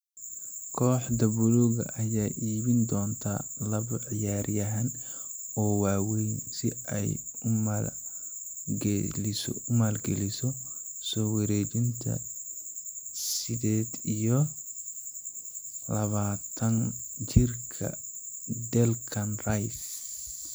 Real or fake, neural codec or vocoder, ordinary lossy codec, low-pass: real; none; none; none